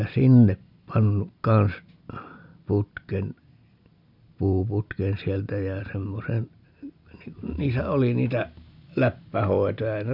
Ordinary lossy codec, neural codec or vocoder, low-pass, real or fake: none; none; 5.4 kHz; real